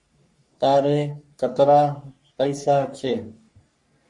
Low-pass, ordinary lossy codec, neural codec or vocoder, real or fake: 10.8 kHz; MP3, 48 kbps; codec, 44.1 kHz, 3.4 kbps, Pupu-Codec; fake